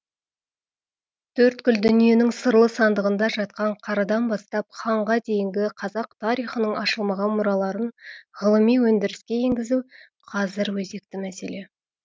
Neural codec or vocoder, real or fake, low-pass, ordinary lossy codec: none; real; none; none